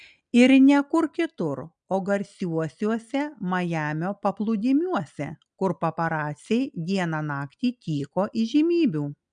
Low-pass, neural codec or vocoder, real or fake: 9.9 kHz; none; real